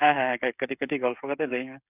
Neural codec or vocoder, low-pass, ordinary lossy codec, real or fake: codec, 16 kHz, 8 kbps, FreqCodec, smaller model; 3.6 kHz; none; fake